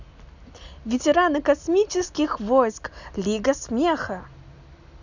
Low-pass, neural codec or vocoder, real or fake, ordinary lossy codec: 7.2 kHz; none; real; none